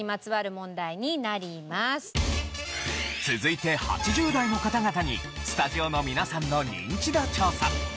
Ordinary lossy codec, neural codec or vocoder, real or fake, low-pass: none; none; real; none